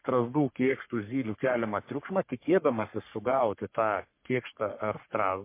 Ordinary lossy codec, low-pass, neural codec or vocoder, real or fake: MP3, 24 kbps; 3.6 kHz; codec, 44.1 kHz, 3.4 kbps, Pupu-Codec; fake